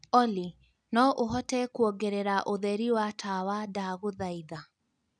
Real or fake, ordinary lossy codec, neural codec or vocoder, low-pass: real; none; none; 9.9 kHz